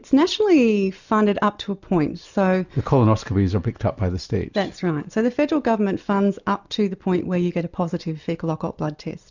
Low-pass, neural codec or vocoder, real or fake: 7.2 kHz; none; real